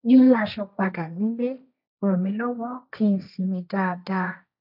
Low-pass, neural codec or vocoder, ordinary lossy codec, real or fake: 5.4 kHz; codec, 16 kHz, 1.1 kbps, Voila-Tokenizer; none; fake